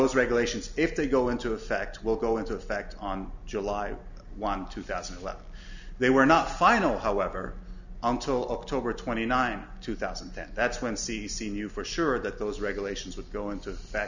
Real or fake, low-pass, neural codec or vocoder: real; 7.2 kHz; none